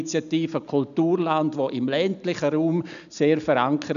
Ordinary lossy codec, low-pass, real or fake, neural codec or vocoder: none; 7.2 kHz; real; none